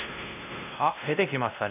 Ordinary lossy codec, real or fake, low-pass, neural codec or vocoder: none; fake; 3.6 kHz; codec, 16 kHz, 1 kbps, X-Codec, WavLM features, trained on Multilingual LibriSpeech